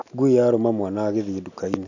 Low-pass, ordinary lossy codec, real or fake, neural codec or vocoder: 7.2 kHz; none; real; none